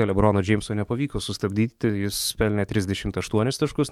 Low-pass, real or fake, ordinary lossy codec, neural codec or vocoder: 19.8 kHz; fake; MP3, 96 kbps; codec, 44.1 kHz, 7.8 kbps, DAC